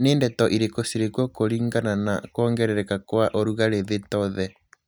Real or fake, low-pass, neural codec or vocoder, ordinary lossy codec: real; none; none; none